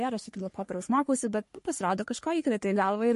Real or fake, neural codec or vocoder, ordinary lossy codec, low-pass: fake; codec, 44.1 kHz, 3.4 kbps, Pupu-Codec; MP3, 48 kbps; 14.4 kHz